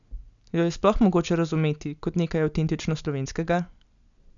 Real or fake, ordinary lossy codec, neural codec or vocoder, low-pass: real; none; none; 7.2 kHz